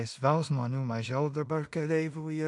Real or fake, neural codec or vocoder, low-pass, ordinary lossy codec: fake; codec, 16 kHz in and 24 kHz out, 0.4 kbps, LongCat-Audio-Codec, two codebook decoder; 10.8 kHz; MP3, 64 kbps